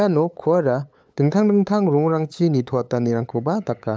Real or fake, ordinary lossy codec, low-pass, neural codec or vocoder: fake; none; none; codec, 16 kHz, 8 kbps, FunCodec, trained on LibriTTS, 25 frames a second